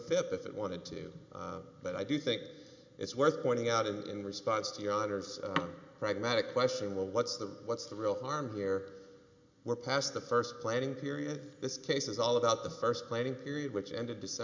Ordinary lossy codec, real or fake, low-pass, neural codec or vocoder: MP3, 64 kbps; real; 7.2 kHz; none